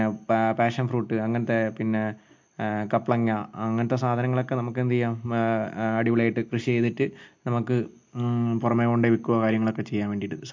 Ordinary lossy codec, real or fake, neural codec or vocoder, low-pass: MP3, 48 kbps; real; none; 7.2 kHz